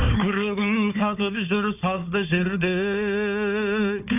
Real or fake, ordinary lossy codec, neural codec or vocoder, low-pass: fake; none; codec, 16 kHz, 4 kbps, FunCodec, trained on Chinese and English, 50 frames a second; 3.6 kHz